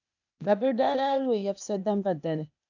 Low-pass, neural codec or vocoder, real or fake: 7.2 kHz; codec, 16 kHz, 0.8 kbps, ZipCodec; fake